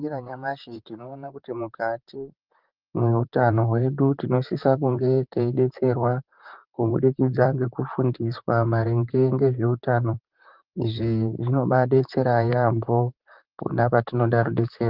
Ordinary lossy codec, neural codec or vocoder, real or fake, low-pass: Opus, 32 kbps; vocoder, 44.1 kHz, 128 mel bands, Pupu-Vocoder; fake; 5.4 kHz